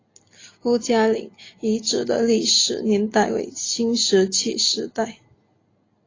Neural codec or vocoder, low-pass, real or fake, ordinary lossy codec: none; 7.2 kHz; real; AAC, 32 kbps